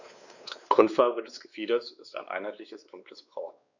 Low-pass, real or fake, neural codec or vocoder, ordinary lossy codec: 7.2 kHz; fake; codec, 16 kHz, 2 kbps, X-Codec, WavLM features, trained on Multilingual LibriSpeech; none